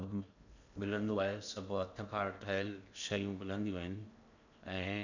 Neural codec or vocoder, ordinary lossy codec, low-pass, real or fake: codec, 16 kHz in and 24 kHz out, 0.8 kbps, FocalCodec, streaming, 65536 codes; none; 7.2 kHz; fake